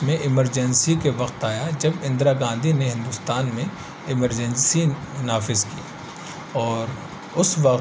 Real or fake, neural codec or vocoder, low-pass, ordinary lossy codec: real; none; none; none